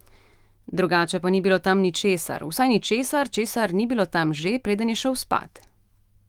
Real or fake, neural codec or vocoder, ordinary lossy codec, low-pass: fake; autoencoder, 48 kHz, 128 numbers a frame, DAC-VAE, trained on Japanese speech; Opus, 24 kbps; 19.8 kHz